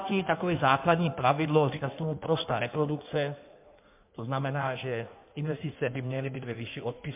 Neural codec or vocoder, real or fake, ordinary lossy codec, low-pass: codec, 16 kHz in and 24 kHz out, 1.1 kbps, FireRedTTS-2 codec; fake; MP3, 32 kbps; 3.6 kHz